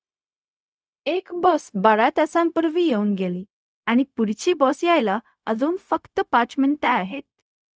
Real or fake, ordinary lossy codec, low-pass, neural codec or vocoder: fake; none; none; codec, 16 kHz, 0.4 kbps, LongCat-Audio-Codec